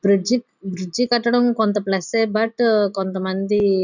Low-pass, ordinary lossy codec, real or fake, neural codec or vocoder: 7.2 kHz; none; real; none